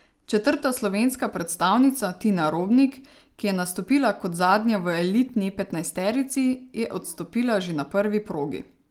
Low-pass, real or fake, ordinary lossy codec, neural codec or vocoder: 19.8 kHz; real; Opus, 24 kbps; none